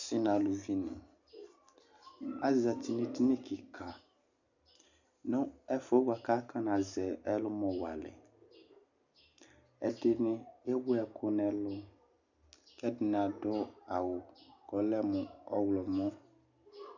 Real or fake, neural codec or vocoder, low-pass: real; none; 7.2 kHz